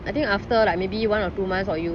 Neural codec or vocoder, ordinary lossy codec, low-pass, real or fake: none; none; none; real